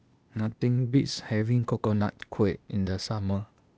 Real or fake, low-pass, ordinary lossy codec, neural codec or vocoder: fake; none; none; codec, 16 kHz, 0.8 kbps, ZipCodec